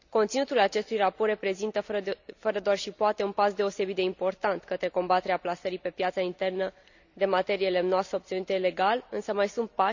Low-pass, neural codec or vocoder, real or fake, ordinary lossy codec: 7.2 kHz; none; real; none